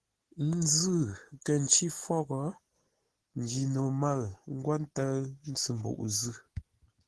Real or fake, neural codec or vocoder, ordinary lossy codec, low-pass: real; none; Opus, 16 kbps; 10.8 kHz